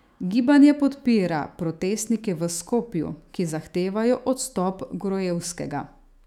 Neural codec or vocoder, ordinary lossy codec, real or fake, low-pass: autoencoder, 48 kHz, 128 numbers a frame, DAC-VAE, trained on Japanese speech; none; fake; 19.8 kHz